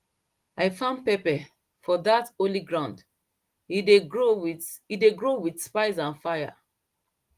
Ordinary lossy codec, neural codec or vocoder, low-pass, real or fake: Opus, 24 kbps; none; 14.4 kHz; real